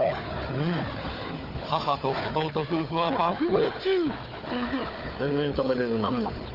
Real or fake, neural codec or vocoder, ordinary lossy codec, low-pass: fake; codec, 16 kHz, 4 kbps, FunCodec, trained on Chinese and English, 50 frames a second; Opus, 32 kbps; 5.4 kHz